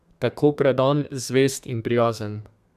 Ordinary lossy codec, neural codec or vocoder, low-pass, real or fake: none; codec, 32 kHz, 1.9 kbps, SNAC; 14.4 kHz; fake